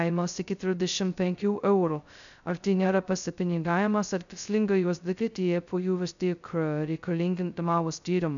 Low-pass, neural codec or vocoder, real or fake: 7.2 kHz; codec, 16 kHz, 0.2 kbps, FocalCodec; fake